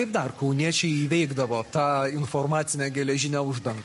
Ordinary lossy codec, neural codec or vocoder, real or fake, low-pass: MP3, 48 kbps; codec, 44.1 kHz, 7.8 kbps, Pupu-Codec; fake; 14.4 kHz